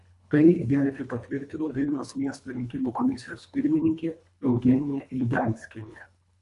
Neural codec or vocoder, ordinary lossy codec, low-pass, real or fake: codec, 24 kHz, 1.5 kbps, HILCodec; MP3, 64 kbps; 10.8 kHz; fake